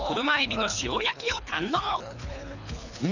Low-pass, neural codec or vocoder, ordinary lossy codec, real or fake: 7.2 kHz; codec, 24 kHz, 3 kbps, HILCodec; none; fake